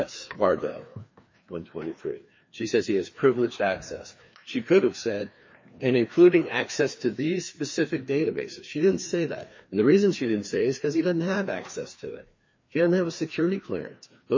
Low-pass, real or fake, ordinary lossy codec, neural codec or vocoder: 7.2 kHz; fake; MP3, 32 kbps; codec, 16 kHz, 2 kbps, FreqCodec, larger model